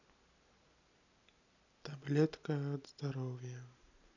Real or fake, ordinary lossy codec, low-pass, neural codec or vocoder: real; none; 7.2 kHz; none